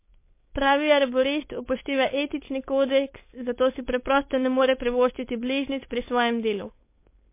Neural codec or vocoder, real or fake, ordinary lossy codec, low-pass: codec, 16 kHz, 4.8 kbps, FACodec; fake; MP3, 24 kbps; 3.6 kHz